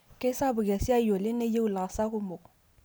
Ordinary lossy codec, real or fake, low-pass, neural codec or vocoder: none; real; none; none